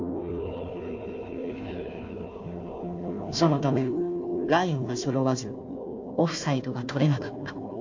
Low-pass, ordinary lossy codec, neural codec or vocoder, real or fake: 7.2 kHz; MP3, 48 kbps; codec, 16 kHz, 1 kbps, FunCodec, trained on Chinese and English, 50 frames a second; fake